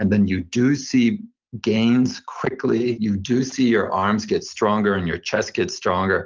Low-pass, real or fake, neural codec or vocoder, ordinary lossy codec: 7.2 kHz; real; none; Opus, 24 kbps